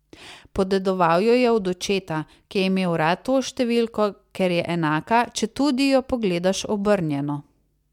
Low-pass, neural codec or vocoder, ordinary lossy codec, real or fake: 19.8 kHz; none; MP3, 96 kbps; real